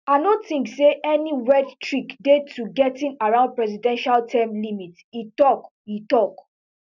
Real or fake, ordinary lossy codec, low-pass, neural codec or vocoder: real; none; 7.2 kHz; none